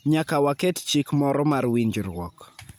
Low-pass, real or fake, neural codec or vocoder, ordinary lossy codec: none; real; none; none